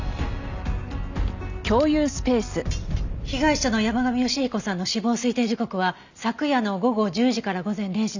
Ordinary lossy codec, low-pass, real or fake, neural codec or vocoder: none; 7.2 kHz; real; none